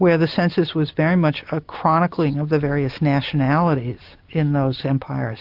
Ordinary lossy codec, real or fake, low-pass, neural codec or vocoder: AAC, 48 kbps; real; 5.4 kHz; none